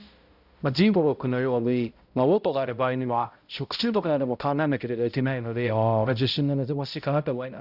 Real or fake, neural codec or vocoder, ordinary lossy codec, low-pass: fake; codec, 16 kHz, 0.5 kbps, X-Codec, HuBERT features, trained on balanced general audio; Opus, 64 kbps; 5.4 kHz